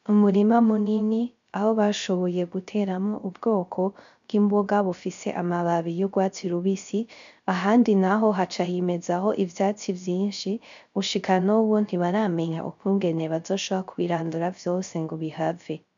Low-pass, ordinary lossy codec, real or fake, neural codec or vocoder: 7.2 kHz; MP3, 64 kbps; fake; codec, 16 kHz, 0.3 kbps, FocalCodec